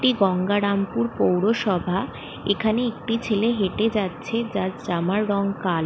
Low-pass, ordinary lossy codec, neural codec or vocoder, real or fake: none; none; none; real